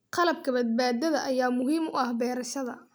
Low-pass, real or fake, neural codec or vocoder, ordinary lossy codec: none; real; none; none